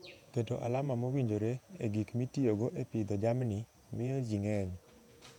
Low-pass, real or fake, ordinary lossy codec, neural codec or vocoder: 19.8 kHz; fake; none; vocoder, 48 kHz, 128 mel bands, Vocos